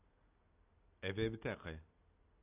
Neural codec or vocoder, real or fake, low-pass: none; real; 3.6 kHz